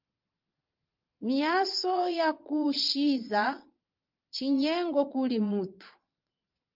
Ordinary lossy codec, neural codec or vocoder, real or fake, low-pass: Opus, 24 kbps; vocoder, 22.05 kHz, 80 mel bands, Vocos; fake; 5.4 kHz